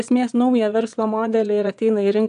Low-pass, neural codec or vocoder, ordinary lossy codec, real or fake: 9.9 kHz; vocoder, 22.05 kHz, 80 mel bands, Vocos; AAC, 96 kbps; fake